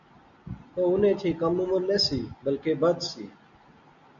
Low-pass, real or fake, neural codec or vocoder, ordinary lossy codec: 7.2 kHz; real; none; MP3, 96 kbps